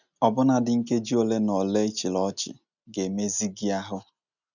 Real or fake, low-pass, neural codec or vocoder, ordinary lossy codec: real; 7.2 kHz; none; none